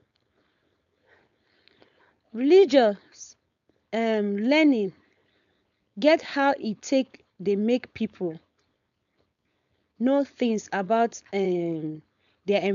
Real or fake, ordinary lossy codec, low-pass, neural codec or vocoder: fake; none; 7.2 kHz; codec, 16 kHz, 4.8 kbps, FACodec